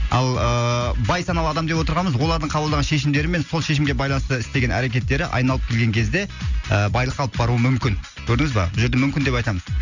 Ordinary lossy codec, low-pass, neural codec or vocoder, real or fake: none; 7.2 kHz; none; real